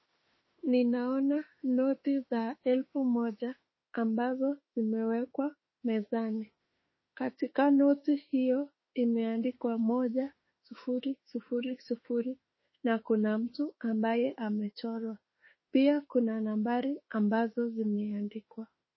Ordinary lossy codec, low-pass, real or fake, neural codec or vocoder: MP3, 24 kbps; 7.2 kHz; fake; autoencoder, 48 kHz, 32 numbers a frame, DAC-VAE, trained on Japanese speech